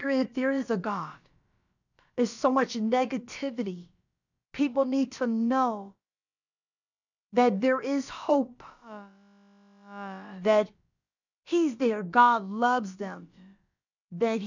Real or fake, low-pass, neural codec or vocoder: fake; 7.2 kHz; codec, 16 kHz, about 1 kbps, DyCAST, with the encoder's durations